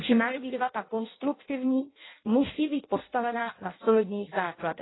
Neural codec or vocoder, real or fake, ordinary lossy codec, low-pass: codec, 16 kHz in and 24 kHz out, 0.6 kbps, FireRedTTS-2 codec; fake; AAC, 16 kbps; 7.2 kHz